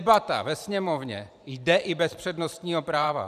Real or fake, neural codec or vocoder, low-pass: fake; vocoder, 44.1 kHz, 128 mel bands every 512 samples, BigVGAN v2; 14.4 kHz